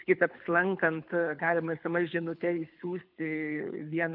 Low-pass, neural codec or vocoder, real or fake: 5.4 kHz; codec, 24 kHz, 6 kbps, HILCodec; fake